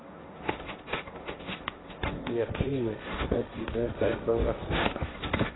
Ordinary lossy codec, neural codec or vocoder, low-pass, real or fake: AAC, 16 kbps; codec, 16 kHz, 1.1 kbps, Voila-Tokenizer; 7.2 kHz; fake